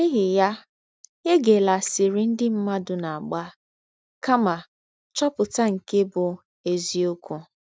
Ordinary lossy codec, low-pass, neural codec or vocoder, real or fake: none; none; none; real